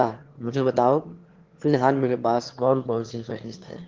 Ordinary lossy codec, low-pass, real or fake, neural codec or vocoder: Opus, 16 kbps; 7.2 kHz; fake; autoencoder, 22.05 kHz, a latent of 192 numbers a frame, VITS, trained on one speaker